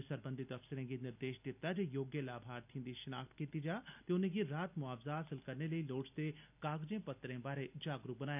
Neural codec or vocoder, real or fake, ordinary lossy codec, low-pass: none; real; none; 3.6 kHz